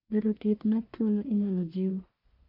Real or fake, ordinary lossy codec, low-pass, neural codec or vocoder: fake; none; 5.4 kHz; codec, 44.1 kHz, 1.7 kbps, Pupu-Codec